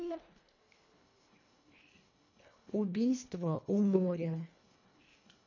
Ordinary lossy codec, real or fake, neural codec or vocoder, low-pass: MP3, 48 kbps; fake; codec, 24 kHz, 1.5 kbps, HILCodec; 7.2 kHz